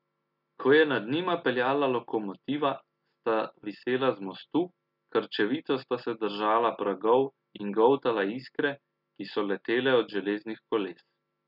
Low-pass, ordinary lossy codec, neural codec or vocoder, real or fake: 5.4 kHz; none; none; real